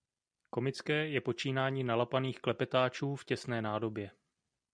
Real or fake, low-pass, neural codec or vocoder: real; 9.9 kHz; none